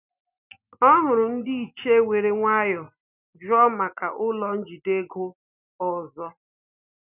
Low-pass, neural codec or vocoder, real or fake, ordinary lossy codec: 3.6 kHz; none; real; none